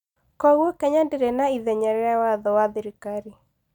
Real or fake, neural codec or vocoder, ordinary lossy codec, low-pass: real; none; none; 19.8 kHz